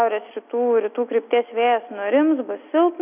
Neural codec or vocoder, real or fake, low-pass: none; real; 3.6 kHz